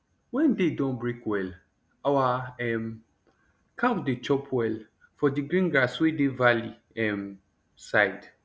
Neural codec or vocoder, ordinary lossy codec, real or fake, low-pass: none; none; real; none